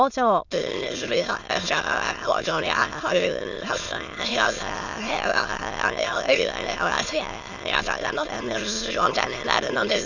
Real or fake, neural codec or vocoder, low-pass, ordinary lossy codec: fake; autoencoder, 22.05 kHz, a latent of 192 numbers a frame, VITS, trained on many speakers; 7.2 kHz; none